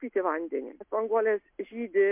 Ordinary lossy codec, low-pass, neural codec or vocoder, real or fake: MP3, 32 kbps; 3.6 kHz; none; real